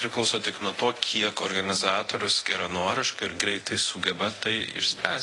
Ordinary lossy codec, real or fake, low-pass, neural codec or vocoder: AAC, 32 kbps; fake; 10.8 kHz; codec, 24 kHz, 0.9 kbps, DualCodec